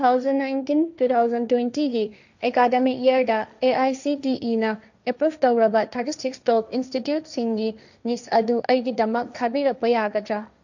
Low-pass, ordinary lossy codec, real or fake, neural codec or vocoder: 7.2 kHz; none; fake; codec, 16 kHz, 1.1 kbps, Voila-Tokenizer